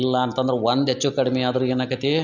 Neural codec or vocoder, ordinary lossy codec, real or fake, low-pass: none; none; real; 7.2 kHz